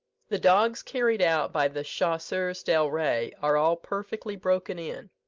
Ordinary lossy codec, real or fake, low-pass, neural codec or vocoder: Opus, 24 kbps; real; 7.2 kHz; none